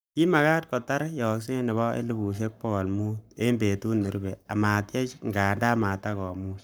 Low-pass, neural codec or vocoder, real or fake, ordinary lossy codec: none; codec, 44.1 kHz, 7.8 kbps, Pupu-Codec; fake; none